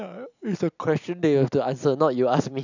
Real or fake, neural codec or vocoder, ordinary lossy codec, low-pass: real; none; none; 7.2 kHz